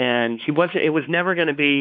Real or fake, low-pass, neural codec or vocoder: fake; 7.2 kHz; codec, 16 kHz, 4 kbps, X-Codec, WavLM features, trained on Multilingual LibriSpeech